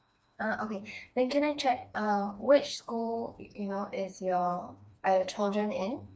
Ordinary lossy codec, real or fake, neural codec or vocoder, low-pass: none; fake; codec, 16 kHz, 2 kbps, FreqCodec, smaller model; none